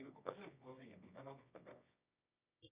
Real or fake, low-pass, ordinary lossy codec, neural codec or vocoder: fake; 3.6 kHz; Opus, 64 kbps; codec, 24 kHz, 0.9 kbps, WavTokenizer, medium music audio release